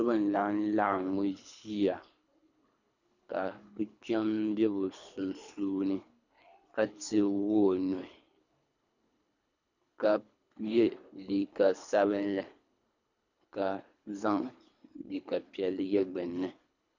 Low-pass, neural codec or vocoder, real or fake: 7.2 kHz; codec, 24 kHz, 3 kbps, HILCodec; fake